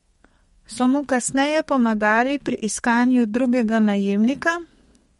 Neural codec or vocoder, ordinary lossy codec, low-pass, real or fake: codec, 32 kHz, 1.9 kbps, SNAC; MP3, 48 kbps; 14.4 kHz; fake